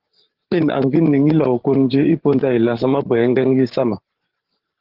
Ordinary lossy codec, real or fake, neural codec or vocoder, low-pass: Opus, 16 kbps; fake; codec, 16 kHz, 8 kbps, FreqCodec, larger model; 5.4 kHz